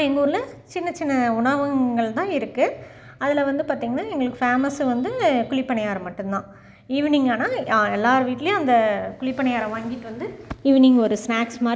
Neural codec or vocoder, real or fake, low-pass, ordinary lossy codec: none; real; none; none